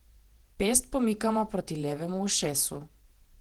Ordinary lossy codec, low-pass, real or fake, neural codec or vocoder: Opus, 16 kbps; 19.8 kHz; fake; vocoder, 48 kHz, 128 mel bands, Vocos